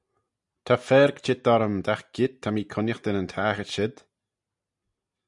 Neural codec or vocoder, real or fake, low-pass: none; real; 10.8 kHz